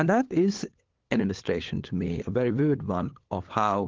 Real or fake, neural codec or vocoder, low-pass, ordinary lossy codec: fake; codec, 16 kHz, 8 kbps, FunCodec, trained on LibriTTS, 25 frames a second; 7.2 kHz; Opus, 16 kbps